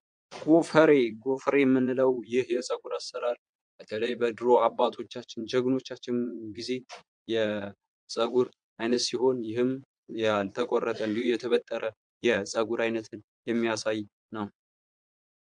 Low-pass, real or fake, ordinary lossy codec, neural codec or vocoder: 9.9 kHz; fake; MP3, 64 kbps; vocoder, 22.05 kHz, 80 mel bands, Vocos